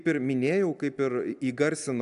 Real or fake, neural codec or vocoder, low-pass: real; none; 10.8 kHz